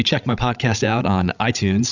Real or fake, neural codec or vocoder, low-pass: fake; codec, 16 kHz, 16 kbps, FreqCodec, larger model; 7.2 kHz